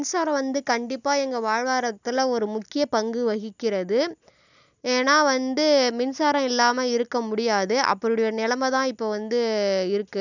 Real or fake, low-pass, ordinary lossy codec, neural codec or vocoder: real; 7.2 kHz; none; none